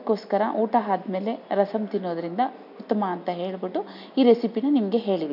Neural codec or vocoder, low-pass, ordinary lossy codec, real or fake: none; 5.4 kHz; none; real